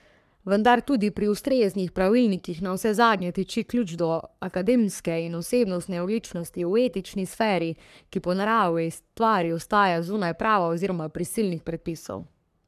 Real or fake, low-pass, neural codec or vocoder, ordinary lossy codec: fake; 14.4 kHz; codec, 44.1 kHz, 3.4 kbps, Pupu-Codec; none